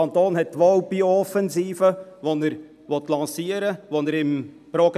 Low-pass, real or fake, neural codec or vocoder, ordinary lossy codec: 14.4 kHz; real; none; none